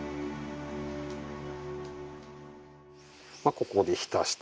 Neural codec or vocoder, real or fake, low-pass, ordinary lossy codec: none; real; none; none